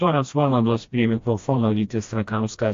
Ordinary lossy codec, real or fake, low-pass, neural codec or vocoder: AAC, 48 kbps; fake; 7.2 kHz; codec, 16 kHz, 1 kbps, FreqCodec, smaller model